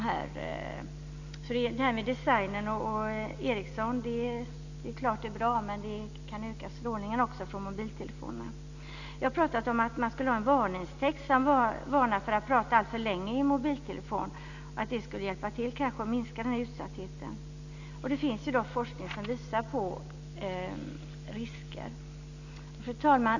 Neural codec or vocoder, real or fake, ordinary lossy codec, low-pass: none; real; none; 7.2 kHz